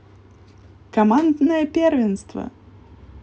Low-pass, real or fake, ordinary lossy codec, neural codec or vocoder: none; real; none; none